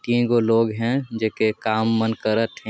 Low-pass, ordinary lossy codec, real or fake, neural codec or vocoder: none; none; real; none